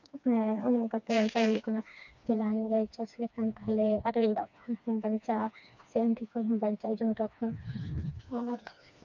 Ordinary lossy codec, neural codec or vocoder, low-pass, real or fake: none; codec, 16 kHz, 2 kbps, FreqCodec, smaller model; 7.2 kHz; fake